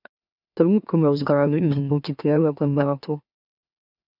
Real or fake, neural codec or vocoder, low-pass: fake; autoencoder, 44.1 kHz, a latent of 192 numbers a frame, MeloTTS; 5.4 kHz